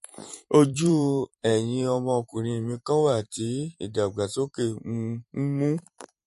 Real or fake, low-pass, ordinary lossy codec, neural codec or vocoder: fake; 14.4 kHz; MP3, 48 kbps; vocoder, 44.1 kHz, 128 mel bands every 512 samples, BigVGAN v2